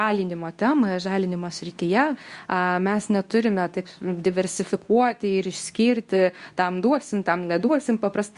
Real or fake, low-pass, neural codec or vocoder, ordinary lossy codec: fake; 10.8 kHz; codec, 24 kHz, 0.9 kbps, WavTokenizer, medium speech release version 2; Opus, 64 kbps